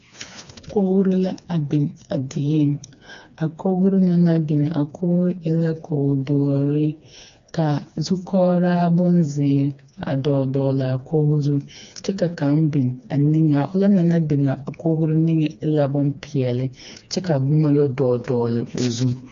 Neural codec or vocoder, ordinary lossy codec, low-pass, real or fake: codec, 16 kHz, 2 kbps, FreqCodec, smaller model; AAC, 64 kbps; 7.2 kHz; fake